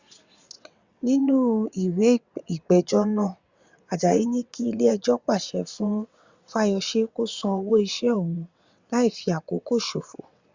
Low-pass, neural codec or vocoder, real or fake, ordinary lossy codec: 7.2 kHz; vocoder, 22.05 kHz, 80 mel bands, WaveNeXt; fake; Opus, 64 kbps